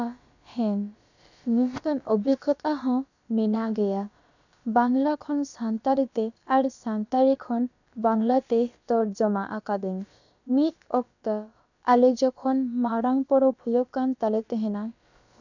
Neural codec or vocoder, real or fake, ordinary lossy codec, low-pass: codec, 16 kHz, about 1 kbps, DyCAST, with the encoder's durations; fake; none; 7.2 kHz